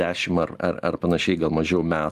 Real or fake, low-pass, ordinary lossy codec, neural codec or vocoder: real; 14.4 kHz; Opus, 32 kbps; none